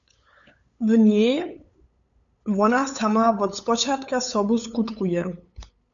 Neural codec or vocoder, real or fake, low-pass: codec, 16 kHz, 8 kbps, FunCodec, trained on LibriTTS, 25 frames a second; fake; 7.2 kHz